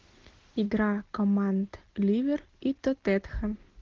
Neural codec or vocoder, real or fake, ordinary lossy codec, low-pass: none; real; Opus, 16 kbps; 7.2 kHz